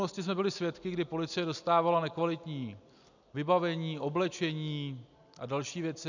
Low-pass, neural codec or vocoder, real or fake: 7.2 kHz; none; real